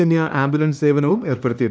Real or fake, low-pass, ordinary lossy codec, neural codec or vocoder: fake; none; none; codec, 16 kHz, 2 kbps, X-Codec, HuBERT features, trained on LibriSpeech